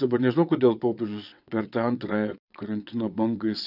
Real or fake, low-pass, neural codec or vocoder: fake; 5.4 kHz; vocoder, 22.05 kHz, 80 mel bands, WaveNeXt